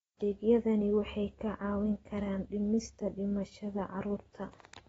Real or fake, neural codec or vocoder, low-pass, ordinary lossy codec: real; none; 19.8 kHz; AAC, 24 kbps